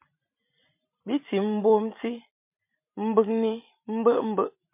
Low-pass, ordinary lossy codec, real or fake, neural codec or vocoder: 3.6 kHz; MP3, 32 kbps; fake; vocoder, 44.1 kHz, 128 mel bands every 256 samples, BigVGAN v2